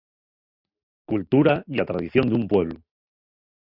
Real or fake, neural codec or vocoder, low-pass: real; none; 5.4 kHz